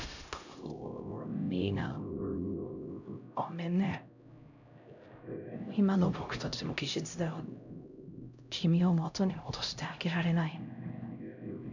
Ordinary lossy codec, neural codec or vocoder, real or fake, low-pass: none; codec, 16 kHz, 0.5 kbps, X-Codec, HuBERT features, trained on LibriSpeech; fake; 7.2 kHz